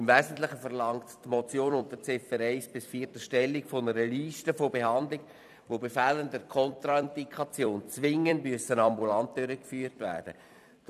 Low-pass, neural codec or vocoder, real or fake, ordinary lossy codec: 14.4 kHz; vocoder, 44.1 kHz, 128 mel bands every 256 samples, BigVGAN v2; fake; none